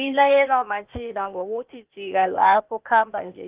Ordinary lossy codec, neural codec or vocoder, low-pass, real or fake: Opus, 24 kbps; codec, 16 kHz, 0.8 kbps, ZipCodec; 3.6 kHz; fake